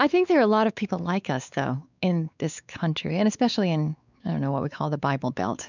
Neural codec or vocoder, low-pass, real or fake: codec, 16 kHz, 4 kbps, X-Codec, WavLM features, trained on Multilingual LibriSpeech; 7.2 kHz; fake